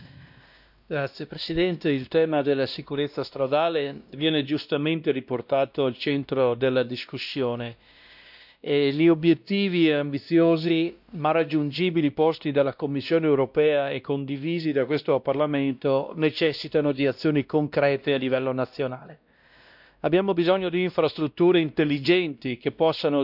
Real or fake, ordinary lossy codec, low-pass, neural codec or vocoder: fake; none; 5.4 kHz; codec, 16 kHz, 1 kbps, X-Codec, WavLM features, trained on Multilingual LibriSpeech